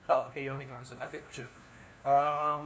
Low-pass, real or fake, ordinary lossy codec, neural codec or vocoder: none; fake; none; codec, 16 kHz, 1 kbps, FunCodec, trained on LibriTTS, 50 frames a second